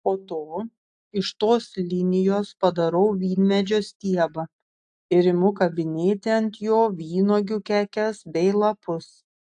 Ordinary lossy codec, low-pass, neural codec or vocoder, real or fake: AAC, 64 kbps; 9.9 kHz; none; real